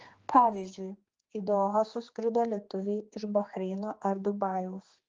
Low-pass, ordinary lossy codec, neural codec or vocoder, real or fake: 7.2 kHz; Opus, 24 kbps; codec, 16 kHz, 2 kbps, X-Codec, HuBERT features, trained on general audio; fake